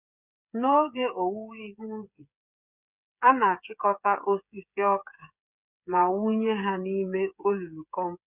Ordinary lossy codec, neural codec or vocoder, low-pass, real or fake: Opus, 64 kbps; codec, 16 kHz, 8 kbps, FreqCodec, smaller model; 3.6 kHz; fake